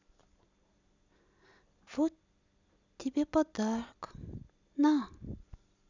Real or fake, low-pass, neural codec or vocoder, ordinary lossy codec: real; 7.2 kHz; none; none